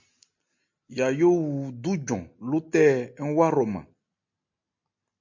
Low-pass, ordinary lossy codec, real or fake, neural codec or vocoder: 7.2 kHz; MP3, 48 kbps; real; none